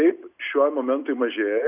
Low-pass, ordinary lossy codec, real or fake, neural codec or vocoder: 3.6 kHz; Opus, 64 kbps; real; none